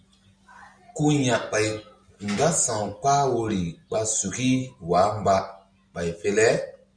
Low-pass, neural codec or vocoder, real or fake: 9.9 kHz; none; real